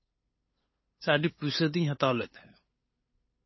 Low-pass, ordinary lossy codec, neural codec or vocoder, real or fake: 7.2 kHz; MP3, 24 kbps; codec, 16 kHz, 8 kbps, FunCodec, trained on LibriTTS, 25 frames a second; fake